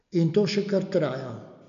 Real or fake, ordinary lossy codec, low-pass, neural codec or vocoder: real; MP3, 96 kbps; 7.2 kHz; none